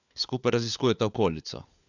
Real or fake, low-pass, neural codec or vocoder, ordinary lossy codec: fake; 7.2 kHz; codec, 16 kHz, 4 kbps, FunCodec, trained on LibriTTS, 50 frames a second; none